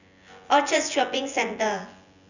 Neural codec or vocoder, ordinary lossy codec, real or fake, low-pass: vocoder, 24 kHz, 100 mel bands, Vocos; none; fake; 7.2 kHz